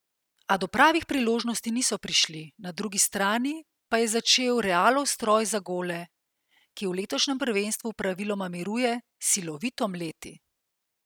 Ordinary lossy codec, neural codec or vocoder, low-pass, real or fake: none; none; none; real